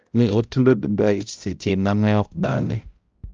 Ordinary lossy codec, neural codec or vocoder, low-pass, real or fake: Opus, 24 kbps; codec, 16 kHz, 0.5 kbps, X-Codec, HuBERT features, trained on balanced general audio; 7.2 kHz; fake